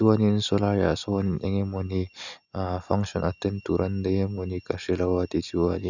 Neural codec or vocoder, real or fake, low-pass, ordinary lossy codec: none; real; 7.2 kHz; none